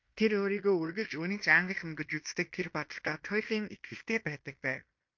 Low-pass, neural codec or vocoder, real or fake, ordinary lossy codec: 7.2 kHz; codec, 16 kHz in and 24 kHz out, 0.9 kbps, LongCat-Audio-Codec, fine tuned four codebook decoder; fake; MP3, 48 kbps